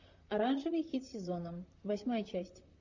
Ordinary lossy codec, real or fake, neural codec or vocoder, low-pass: Opus, 64 kbps; fake; vocoder, 44.1 kHz, 128 mel bands, Pupu-Vocoder; 7.2 kHz